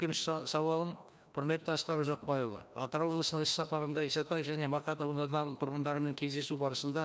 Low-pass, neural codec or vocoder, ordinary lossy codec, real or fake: none; codec, 16 kHz, 1 kbps, FreqCodec, larger model; none; fake